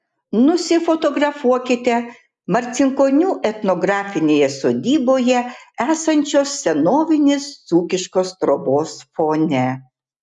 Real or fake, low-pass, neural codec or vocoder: real; 10.8 kHz; none